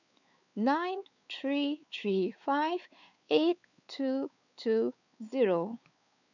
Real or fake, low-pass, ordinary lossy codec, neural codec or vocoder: fake; 7.2 kHz; none; codec, 16 kHz, 4 kbps, X-Codec, WavLM features, trained on Multilingual LibriSpeech